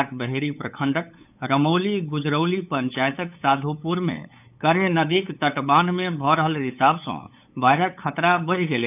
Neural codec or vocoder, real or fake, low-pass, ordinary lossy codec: codec, 16 kHz, 8 kbps, FunCodec, trained on LibriTTS, 25 frames a second; fake; 3.6 kHz; none